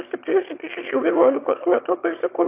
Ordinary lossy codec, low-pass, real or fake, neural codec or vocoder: AAC, 32 kbps; 3.6 kHz; fake; autoencoder, 22.05 kHz, a latent of 192 numbers a frame, VITS, trained on one speaker